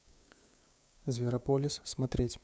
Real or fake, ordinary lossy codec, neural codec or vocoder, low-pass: fake; none; codec, 16 kHz, 6 kbps, DAC; none